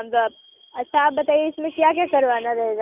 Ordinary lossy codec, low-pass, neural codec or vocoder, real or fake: none; 3.6 kHz; none; real